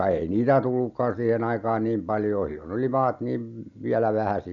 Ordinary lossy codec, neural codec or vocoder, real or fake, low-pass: none; none; real; 7.2 kHz